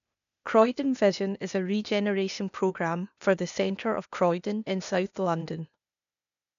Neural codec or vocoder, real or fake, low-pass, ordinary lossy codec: codec, 16 kHz, 0.8 kbps, ZipCodec; fake; 7.2 kHz; none